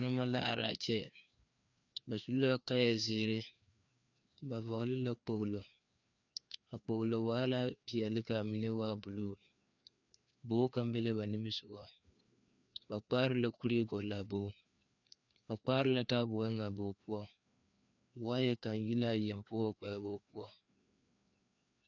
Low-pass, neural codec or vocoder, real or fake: 7.2 kHz; codec, 16 kHz, 2 kbps, FreqCodec, larger model; fake